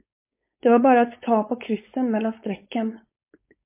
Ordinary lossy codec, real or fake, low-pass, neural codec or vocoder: MP3, 24 kbps; fake; 3.6 kHz; codec, 16 kHz, 4.8 kbps, FACodec